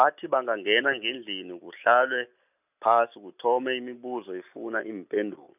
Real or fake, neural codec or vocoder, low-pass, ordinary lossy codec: real; none; 3.6 kHz; none